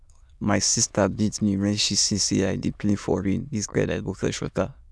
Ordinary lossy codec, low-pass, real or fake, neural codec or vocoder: none; none; fake; autoencoder, 22.05 kHz, a latent of 192 numbers a frame, VITS, trained on many speakers